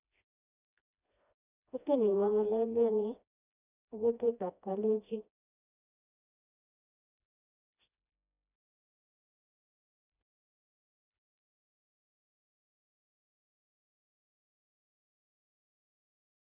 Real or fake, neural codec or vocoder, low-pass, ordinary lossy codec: fake; codec, 16 kHz, 1 kbps, FreqCodec, smaller model; 3.6 kHz; none